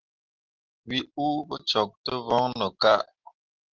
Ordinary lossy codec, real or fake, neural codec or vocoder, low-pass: Opus, 16 kbps; real; none; 7.2 kHz